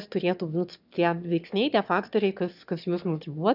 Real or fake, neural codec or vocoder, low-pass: fake; autoencoder, 22.05 kHz, a latent of 192 numbers a frame, VITS, trained on one speaker; 5.4 kHz